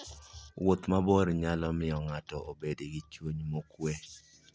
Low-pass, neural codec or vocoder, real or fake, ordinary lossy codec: none; none; real; none